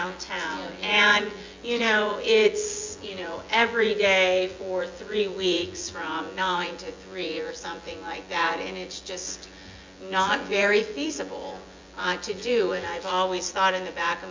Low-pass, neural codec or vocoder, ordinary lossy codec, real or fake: 7.2 kHz; vocoder, 24 kHz, 100 mel bands, Vocos; MP3, 64 kbps; fake